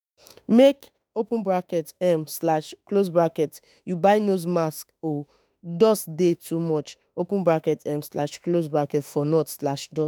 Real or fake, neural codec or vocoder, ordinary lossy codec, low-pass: fake; autoencoder, 48 kHz, 32 numbers a frame, DAC-VAE, trained on Japanese speech; none; none